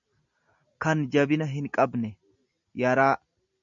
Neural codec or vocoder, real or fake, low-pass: none; real; 7.2 kHz